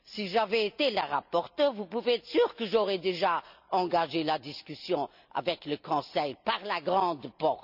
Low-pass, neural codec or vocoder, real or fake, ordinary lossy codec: 5.4 kHz; none; real; none